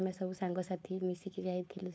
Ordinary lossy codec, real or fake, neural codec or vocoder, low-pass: none; fake; codec, 16 kHz, 4.8 kbps, FACodec; none